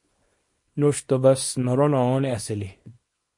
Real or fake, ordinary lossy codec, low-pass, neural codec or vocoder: fake; MP3, 48 kbps; 10.8 kHz; codec, 24 kHz, 0.9 kbps, WavTokenizer, small release